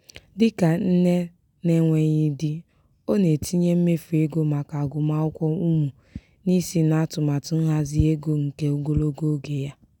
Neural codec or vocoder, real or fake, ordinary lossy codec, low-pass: none; real; none; 19.8 kHz